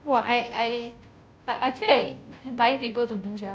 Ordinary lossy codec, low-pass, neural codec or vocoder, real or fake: none; none; codec, 16 kHz, 0.5 kbps, FunCodec, trained on Chinese and English, 25 frames a second; fake